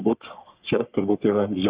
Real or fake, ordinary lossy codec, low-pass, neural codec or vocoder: fake; Opus, 64 kbps; 3.6 kHz; codec, 44.1 kHz, 3.4 kbps, Pupu-Codec